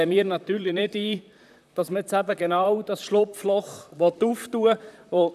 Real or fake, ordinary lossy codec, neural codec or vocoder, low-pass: fake; none; vocoder, 44.1 kHz, 128 mel bands, Pupu-Vocoder; 14.4 kHz